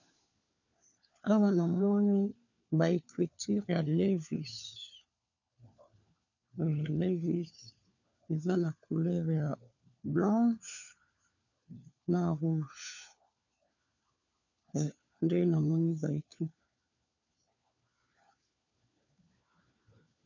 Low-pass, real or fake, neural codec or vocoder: 7.2 kHz; fake; codec, 16 kHz, 4 kbps, FunCodec, trained on LibriTTS, 50 frames a second